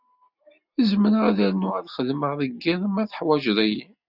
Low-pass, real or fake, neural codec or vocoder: 5.4 kHz; real; none